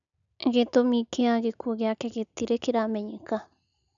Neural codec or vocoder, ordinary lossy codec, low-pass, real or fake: none; none; 7.2 kHz; real